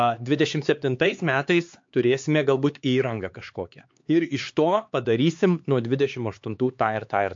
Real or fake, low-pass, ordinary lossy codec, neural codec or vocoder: fake; 7.2 kHz; MP3, 48 kbps; codec, 16 kHz, 4 kbps, X-Codec, HuBERT features, trained on LibriSpeech